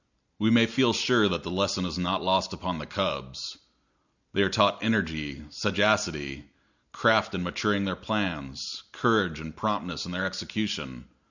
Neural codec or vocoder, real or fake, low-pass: none; real; 7.2 kHz